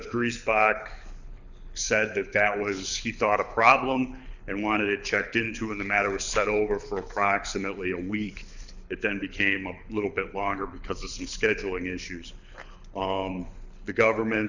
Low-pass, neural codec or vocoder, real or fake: 7.2 kHz; codec, 24 kHz, 6 kbps, HILCodec; fake